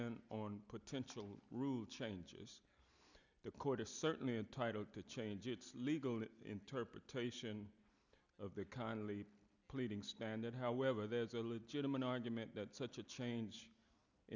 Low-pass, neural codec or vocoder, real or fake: 7.2 kHz; none; real